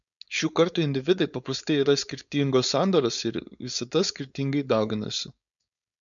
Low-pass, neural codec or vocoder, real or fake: 7.2 kHz; codec, 16 kHz, 4.8 kbps, FACodec; fake